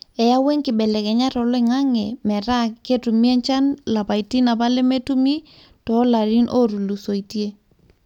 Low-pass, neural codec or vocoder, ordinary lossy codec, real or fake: 19.8 kHz; none; none; real